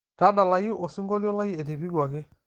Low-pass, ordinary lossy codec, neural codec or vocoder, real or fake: 19.8 kHz; Opus, 16 kbps; codec, 44.1 kHz, 7.8 kbps, DAC; fake